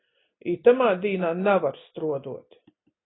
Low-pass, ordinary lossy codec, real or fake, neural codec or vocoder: 7.2 kHz; AAC, 16 kbps; real; none